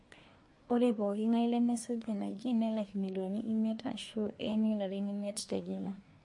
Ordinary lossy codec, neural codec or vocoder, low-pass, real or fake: MP3, 48 kbps; codec, 24 kHz, 1 kbps, SNAC; 10.8 kHz; fake